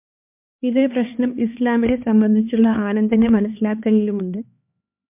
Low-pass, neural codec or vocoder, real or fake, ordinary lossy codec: 3.6 kHz; codec, 16 kHz, 2 kbps, X-Codec, HuBERT features, trained on LibriSpeech; fake; MP3, 32 kbps